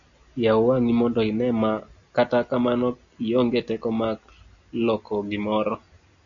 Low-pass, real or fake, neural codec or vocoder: 7.2 kHz; real; none